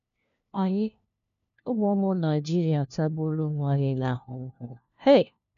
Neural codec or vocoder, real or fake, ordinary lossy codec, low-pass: codec, 16 kHz, 1 kbps, FunCodec, trained on LibriTTS, 50 frames a second; fake; none; 7.2 kHz